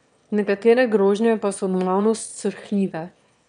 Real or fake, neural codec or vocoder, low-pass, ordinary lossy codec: fake; autoencoder, 22.05 kHz, a latent of 192 numbers a frame, VITS, trained on one speaker; 9.9 kHz; none